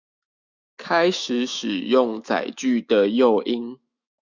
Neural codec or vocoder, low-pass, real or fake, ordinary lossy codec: autoencoder, 48 kHz, 128 numbers a frame, DAC-VAE, trained on Japanese speech; 7.2 kHz; fake; Opus, 64 kbps